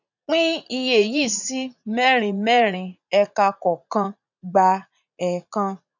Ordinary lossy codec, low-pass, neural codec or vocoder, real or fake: none; 7.2 kHz; vocoder, 44.1 kHz, 80 mel bands, Vocos; fake